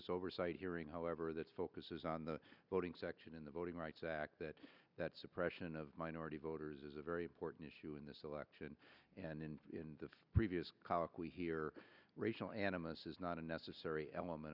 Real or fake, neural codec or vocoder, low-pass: real; none; 5.4 kHz